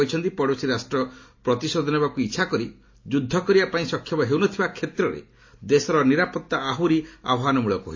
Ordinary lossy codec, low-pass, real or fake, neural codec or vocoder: MP3, 32 kbps; 7.2 kHz; real; none